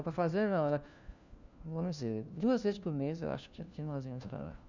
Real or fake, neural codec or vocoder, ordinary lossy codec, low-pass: fake; codec, 16 kHz, 1 kbps, FunCodec, trained on LibriTTS, 50 frames a second; none; 7.2 kHz